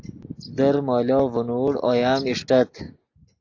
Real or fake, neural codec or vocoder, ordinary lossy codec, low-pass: real; none; AAC, 48 kbps; 7.2 kHz